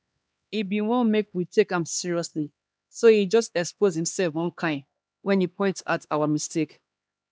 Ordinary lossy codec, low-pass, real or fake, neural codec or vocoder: none; none; fake; codec, 16 kHz, 2 kbps, X-Codec, HuBERT features, trained on LibriSpeech